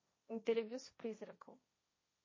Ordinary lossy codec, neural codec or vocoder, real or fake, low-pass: MP3, 32 kbps; codec, 16 kHz, 1.1 kbps, Voila-Tokenizer; fake; 7.2 kHz